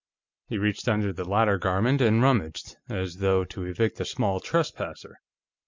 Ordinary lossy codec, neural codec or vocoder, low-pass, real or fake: MP3, 64 kbps; none; 7.2 kHz; real